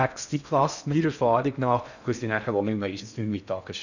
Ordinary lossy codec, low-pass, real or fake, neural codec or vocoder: none; 7.2 kHz; fake; codec, 16 kHz in and 24 kHz out, 0.6 kbps, FocalCodec, streaming, 4096 codes